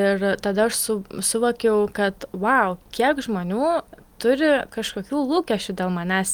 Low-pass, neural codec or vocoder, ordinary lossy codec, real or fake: 19.8 kHz; none; Opus, 32 kbps; real